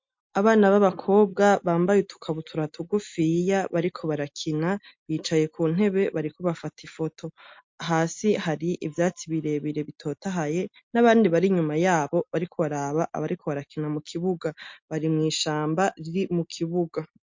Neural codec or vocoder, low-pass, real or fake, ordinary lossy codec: none; 7.2 kHz; real; MP3, 48 kbps